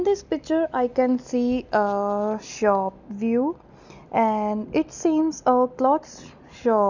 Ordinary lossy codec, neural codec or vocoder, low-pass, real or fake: none; none; 7.2 kHz; real